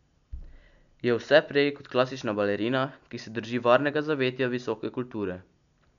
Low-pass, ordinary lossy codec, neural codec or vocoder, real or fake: 7.2 kHz; none; none; real